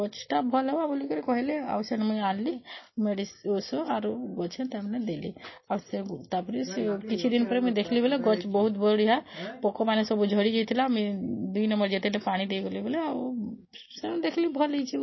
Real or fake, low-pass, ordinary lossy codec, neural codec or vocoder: real; 7.2 kHz; MP3, 24 kbps; none